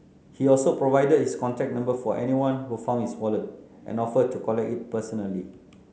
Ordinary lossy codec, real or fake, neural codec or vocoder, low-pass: none; real; none; none